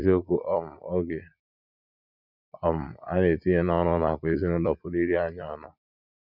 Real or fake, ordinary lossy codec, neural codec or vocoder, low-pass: fake; none; vocoder, 44.1 kHz, 80 mel bands, Vocos; 5.4 kHz